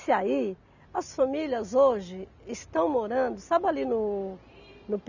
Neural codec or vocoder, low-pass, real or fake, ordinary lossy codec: none; 7.2 kHz; real; none